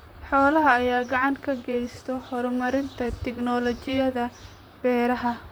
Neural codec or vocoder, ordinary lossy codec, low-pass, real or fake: vocoder, 44.1 kHz, 128 mel bands, Pupu-Vocoder; none; none; fake